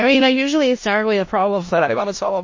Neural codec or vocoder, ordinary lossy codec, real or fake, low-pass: codec, 16 kHz in and 24 kHz out, 0.4 kbps, LongCat-Audio-Codec, four codebook decoder; MP3, 32 kbps; fake; 7.2 kHz